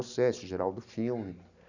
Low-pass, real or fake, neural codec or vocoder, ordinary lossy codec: 7.2 kHz; real; none; none